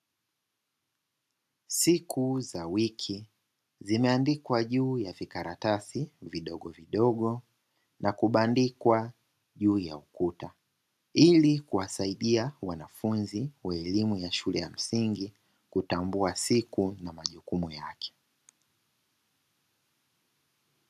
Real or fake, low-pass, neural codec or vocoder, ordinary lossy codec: real; 14.4 kHz; none; AAC, 96 kbps